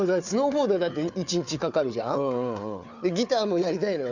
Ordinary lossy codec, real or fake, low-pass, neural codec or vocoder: none; fake; 7.2 kHz; codec, 16 kHz, 4 kbps, FunCodec, trained on Chinese and English, 50 frames a second